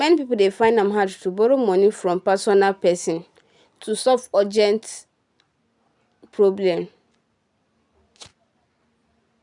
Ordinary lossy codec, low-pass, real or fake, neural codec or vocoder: none; 10.8 kHz; real; none